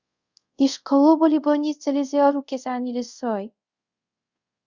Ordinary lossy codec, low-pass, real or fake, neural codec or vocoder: Opus, 64 kbps; 7.2 kHz; fake; codec, 24 kHz, 0.5 kbps, DualCodec